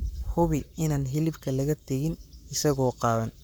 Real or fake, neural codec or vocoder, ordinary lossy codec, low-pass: fake; codec, 44.1 kHz, 7.8 kbps, Pupu-Codec; none; none